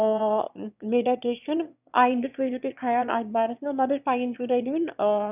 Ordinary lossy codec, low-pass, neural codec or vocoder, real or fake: none; 3.6 kHz; autoencoder, 22.05 kHz, a latent of 192 numbers a frame, VITS, trained on one speaker; fake